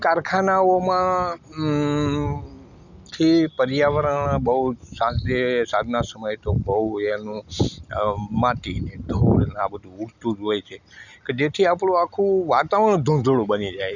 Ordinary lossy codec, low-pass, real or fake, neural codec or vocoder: none; 7.2 kHz; real; none